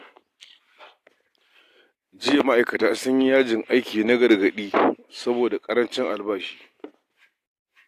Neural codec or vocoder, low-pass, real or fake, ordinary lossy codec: none; 14.4 kHz; real; AAC, 64 kbps